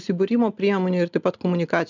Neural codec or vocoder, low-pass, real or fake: none; 7.2 kHz; real